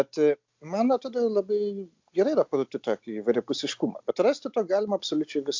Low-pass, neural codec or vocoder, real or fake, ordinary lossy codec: 7.2 kHz; none; real; MP3, 64 kbps